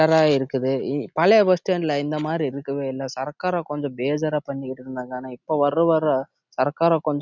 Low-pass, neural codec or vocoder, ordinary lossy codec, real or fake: 7.2 kHz; none; none; real